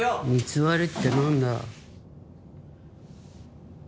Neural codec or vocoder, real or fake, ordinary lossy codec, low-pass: none; real; none; none